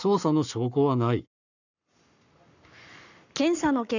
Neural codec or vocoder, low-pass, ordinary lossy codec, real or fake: codec, 44.1 kHz, 7.8 kbps, Pupu-Codec; 7.2 kHz; none; fake